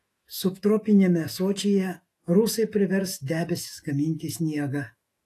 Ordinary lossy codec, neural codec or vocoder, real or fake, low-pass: AAC, 48 kbps; autoencoder, 48 kHz, 128 numbers a frame, DAC-VAE, trained on Japanese speech; fake; 14.4 kHz